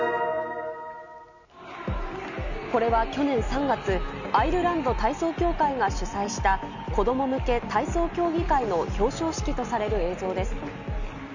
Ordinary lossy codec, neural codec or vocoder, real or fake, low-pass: none; none; real; 7.2 kHz